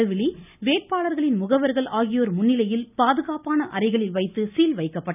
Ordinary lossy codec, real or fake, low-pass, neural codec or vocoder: none; real; 3.6 kHz; none